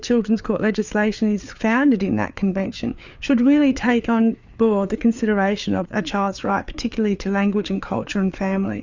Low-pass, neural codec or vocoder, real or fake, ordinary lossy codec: 7.2 kHz; codec, 16 kHz, 4 kbps, FreqCodec, larger model; fake; Opus, 64 kbps